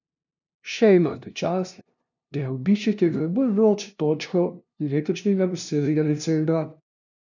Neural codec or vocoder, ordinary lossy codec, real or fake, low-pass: codec, 16 kHz, 0.5 kbps, FunCodec, trained on LibriTTS, 25 frames a second; none; fake; 7.2 kHz